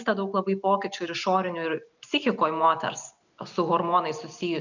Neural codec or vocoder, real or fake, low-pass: none; real; 7.2 kHz